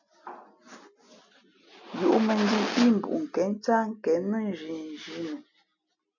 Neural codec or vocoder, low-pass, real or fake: none; 7.2 kHz; real